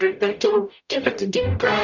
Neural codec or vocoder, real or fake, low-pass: codec, 44.1 kHz, 0.9 kbps, DAC; fake; 7.2 kHz